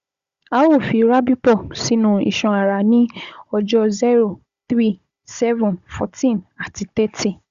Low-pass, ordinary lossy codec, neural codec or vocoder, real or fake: 7.2 kHz; Opus, 64 kbps; codec, 16 kHz, 16 kbps, FunCodec, trained on Chinese and English, 50 frames a second; fake